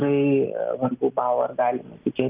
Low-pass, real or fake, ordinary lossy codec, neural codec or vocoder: 3.6 kHz; real; Opus, 24 kbps; none